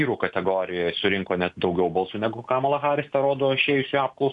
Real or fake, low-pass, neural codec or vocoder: real; 10.8 kHz; none